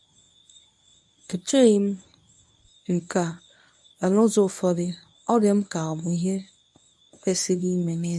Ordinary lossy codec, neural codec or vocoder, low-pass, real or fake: none; codec, 24 kHz, 0.9 kbps, WavTokenizer, medium speech release version 1; none; fake